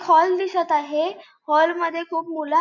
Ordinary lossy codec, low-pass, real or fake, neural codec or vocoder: none; 7.2 kHz; real; none